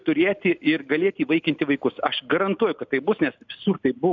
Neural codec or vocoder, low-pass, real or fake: none; 7.2 kHz; real